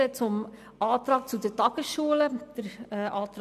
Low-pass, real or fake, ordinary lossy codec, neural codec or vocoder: 14.4 kHz; real; none; none